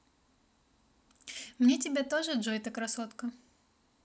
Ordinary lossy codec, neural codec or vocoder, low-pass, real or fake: none; none; none; real